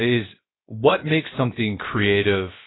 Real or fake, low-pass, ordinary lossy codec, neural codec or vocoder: fake; 7.2 kHz; AAC, 16 kbps; codec, 16 kHz, about 1 kbps, DyCAST, with the encoder's durations